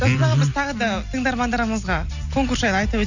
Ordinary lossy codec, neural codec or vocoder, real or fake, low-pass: none; none; real; 7.2 kHz